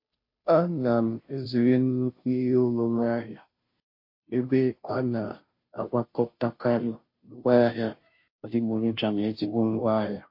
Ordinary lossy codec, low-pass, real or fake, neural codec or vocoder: MP3, 32 kbps; 5.4 kHz; fake; codec, 16 kHz, 0.5 kbps, FunCodec, trained on Chinese and English, 25 frames a second